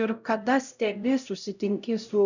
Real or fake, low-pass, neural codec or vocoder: fake; 7.2 kHz; codec, 16 kHz, 0.5 kbps, X-Codec, HuBERT features, trained on LibriSpeech